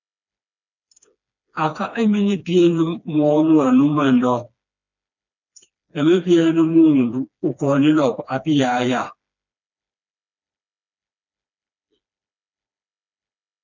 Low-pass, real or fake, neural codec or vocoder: 7.2 kHz; fake; codec, 16 kHz, 2 kbps, FreqCodec, smaller model